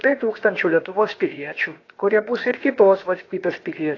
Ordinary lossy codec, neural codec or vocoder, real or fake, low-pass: AAC, 32 kbps; codec, 16 kHz, about 1 kbps, DyCAST, with the encoder's durations; fake; 7.2 kHz